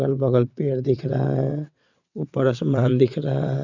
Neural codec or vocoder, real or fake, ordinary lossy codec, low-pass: vocoder, 22.05 kHz, 80 mel bands, WaveNeXt; fake; none; 7.2 kHz